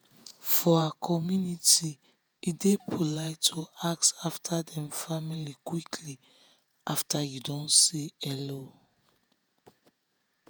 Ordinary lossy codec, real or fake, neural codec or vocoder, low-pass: none; fake; vocoder, 48 kHz, 128 mel bands, Vocos; none